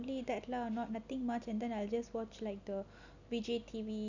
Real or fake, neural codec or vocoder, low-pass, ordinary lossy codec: real; none; 7.2 kHz; none